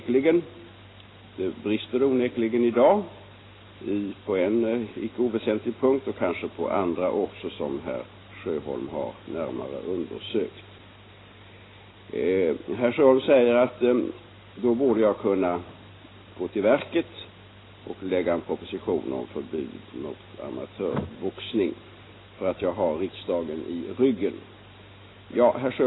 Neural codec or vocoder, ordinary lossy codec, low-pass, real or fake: none; AAC, 16 kbps; 7.2 kHz; real